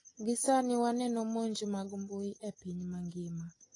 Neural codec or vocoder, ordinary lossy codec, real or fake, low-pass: none; AAC, 32 kbps; real; 10.8 kHz